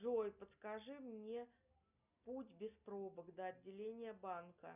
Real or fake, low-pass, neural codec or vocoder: real; 3.6 kHz; none